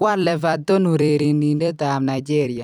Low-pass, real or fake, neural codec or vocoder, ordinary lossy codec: 19.8 kHz; fake; vocoder, 44.1 kHz, 128 mel bands every 256 samples, BigVGAN v2; none